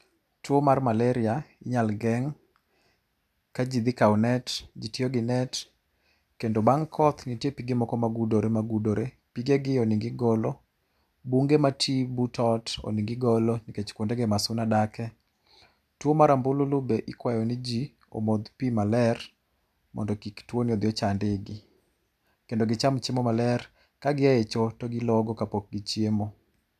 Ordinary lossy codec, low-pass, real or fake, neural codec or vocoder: none; 14.4 kHz; real; none